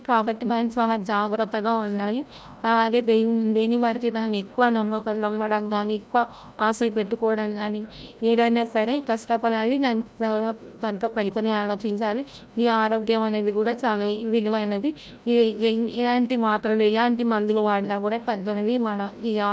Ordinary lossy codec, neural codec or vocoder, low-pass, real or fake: none; codec, 16 kHz, 0.5 kbps, FreqCodec, larger model; none; fake